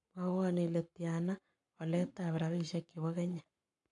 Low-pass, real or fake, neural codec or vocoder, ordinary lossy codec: 10.8 kHz; fake; codec, 44.1 kHz, 7.8 kbps, Pupu-Codec; AAC, 64 kbps